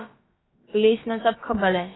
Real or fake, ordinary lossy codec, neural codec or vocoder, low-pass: fake; AAC, 16 kbps; codec, 16 kHz, about 1 kbps, DyCAST, with the encoder's durations; 7.2 kHz